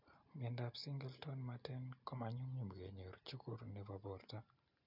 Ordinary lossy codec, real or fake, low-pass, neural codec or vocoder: none; real; 5.4 kHz; none